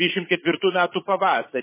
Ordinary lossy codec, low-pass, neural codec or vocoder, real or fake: MP3, 16 kbps; 3.6 kHz; vocoder, 22.05 kHz, 80 mel bands, Vocos; fake